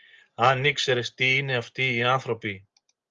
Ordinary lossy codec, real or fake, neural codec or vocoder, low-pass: Opus, 32 kbps; real; none; 7.2 kHz